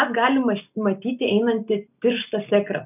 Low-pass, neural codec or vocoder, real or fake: 3.6 kHz; none; real